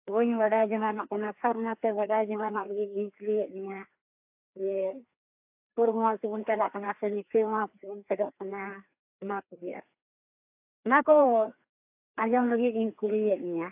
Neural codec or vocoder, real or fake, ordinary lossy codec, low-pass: codec, 16 kHz, 2 kbps, FreqCodec, larger model; fake; AAC, 32 kbps; 3.6 kHz